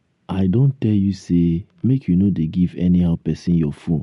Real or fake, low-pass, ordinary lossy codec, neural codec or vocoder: real; 10.8 kHz; MP3, 64 kbps; none